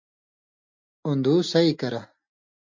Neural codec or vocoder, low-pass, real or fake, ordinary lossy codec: none; 7.2 kHz; real; MP3, 48 kbps